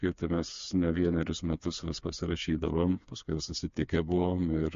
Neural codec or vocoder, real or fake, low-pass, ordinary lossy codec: codec, 16 kHz, 4 kbps, FreqCodec, smaller model; fake; 7.2 kHz; MP3, 48 kbps